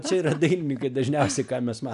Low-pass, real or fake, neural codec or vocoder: 10.8 kHz; real; none